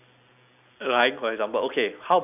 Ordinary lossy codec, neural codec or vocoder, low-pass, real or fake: none; none; 3.6 kHz; real